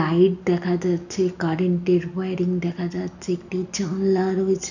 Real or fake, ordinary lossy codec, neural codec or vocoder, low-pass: real; none; none; 7.2 kHz